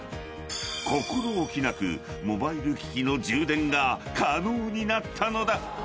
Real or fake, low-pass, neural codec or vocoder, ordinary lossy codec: real; none; none; none